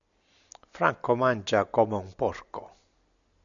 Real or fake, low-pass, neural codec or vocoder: real; 7.2 kHz; none